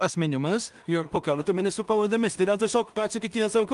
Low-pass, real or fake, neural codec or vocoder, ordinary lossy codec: 10.8 kHz; fake; codec, 16 kHz in and 24 kHz out, 0.4 kbps, LongCat-Audio-Codec, two codebook decoder; Opus, 32 kbps